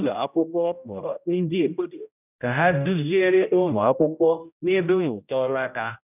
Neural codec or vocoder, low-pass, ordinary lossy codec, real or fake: codec, 16 kHz, 0.5 kbps, X-Codec, HuBERT features, trained on general audio; 3.6 kHz; none; fake